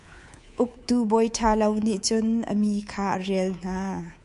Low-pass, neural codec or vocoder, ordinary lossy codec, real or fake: 10.8 kHz; codec, 24 kHz, 3.1 kbps, DualCodec; MP3, 64 kbps; fake